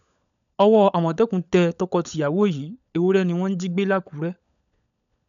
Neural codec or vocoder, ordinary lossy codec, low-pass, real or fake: codec, 16 kHz, 16 kbps, FunCodec, trained on LibriTTS, 50 frames a second; none; 7.2 kHz; fake